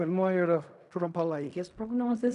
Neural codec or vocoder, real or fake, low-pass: codec, 16 kHz in and 24 kHz out, 0.4 kbps, LongCat-Audio-Codec, fine tuned four codebook decoder; fake; 10.8 kHz